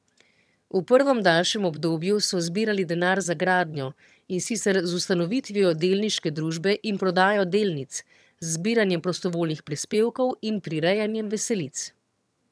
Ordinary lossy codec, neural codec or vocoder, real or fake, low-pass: none; vocoder, 22.05 kHz, 80 mel bands, HiFi-GAN; fake; none